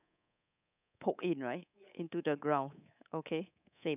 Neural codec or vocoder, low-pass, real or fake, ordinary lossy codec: codec, 24 kHz, 3.1 kbps, DualCodec; 3.6 kHz; fake; none